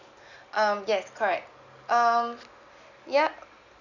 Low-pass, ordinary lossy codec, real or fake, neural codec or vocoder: 7.2 kHz; none; real; none